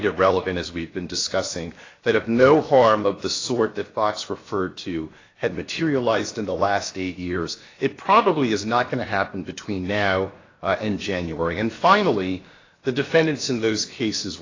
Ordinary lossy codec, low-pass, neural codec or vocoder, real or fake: AAC, 32 kbps; 7.2 kHz; codec, 16 kHz, about 1 kbps, DyCAST, with the encoder's durations; fake